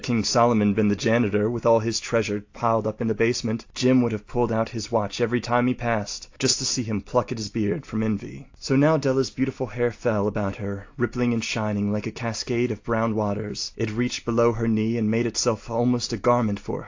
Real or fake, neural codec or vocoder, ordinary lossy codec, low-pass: real; none; AAC, 48 kbps; 7.2 kHz